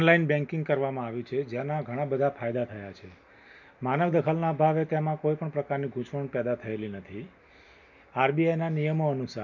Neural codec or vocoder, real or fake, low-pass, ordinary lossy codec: none; real; 7.2 kHz; none